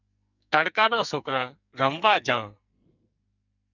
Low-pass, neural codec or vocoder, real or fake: 7.2 kHz; codec, 44.1 kHz, 2.6 kbps, SNAC; fake